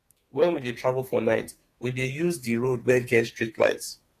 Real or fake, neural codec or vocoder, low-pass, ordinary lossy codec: fake; codec, 32 kHz, 1.9 kbps, SNAC; 14.4 kHz; AAC, 48 kbps